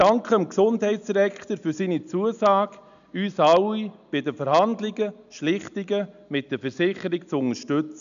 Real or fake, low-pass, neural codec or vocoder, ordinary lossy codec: real; 7.2 kHz; none; none